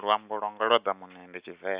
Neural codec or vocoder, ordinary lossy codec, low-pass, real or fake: none; Opus, 64 kbps; 3.6 kHz; real